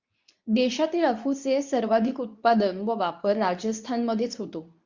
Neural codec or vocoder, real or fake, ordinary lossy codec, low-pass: codec, 24 kHz, 0.9 kbps, WavTokenizer, medium speech release version 2; fake; Opus, 64 kbps; 7.2 kHz